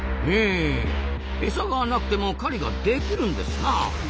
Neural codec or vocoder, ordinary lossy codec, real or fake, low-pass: none; none; real; none